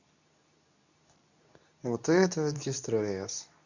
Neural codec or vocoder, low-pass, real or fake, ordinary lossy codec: codec, 24 kHz, 0.9 kbps, WavTokenizer, medium speech release version 2; 7.2 kHz; fake; none